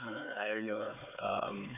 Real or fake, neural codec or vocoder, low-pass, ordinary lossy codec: fake; codec, 16 kHz, 4 kbps, X-Codec, HuBERT features, trained on LibriSpeech; 3.6 kHz; none